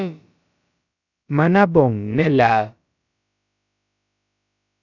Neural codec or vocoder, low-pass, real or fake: codec, 16 kHz, about 1 kbps, DyCAST, with the encoder's durations; 7.2 kHz; fake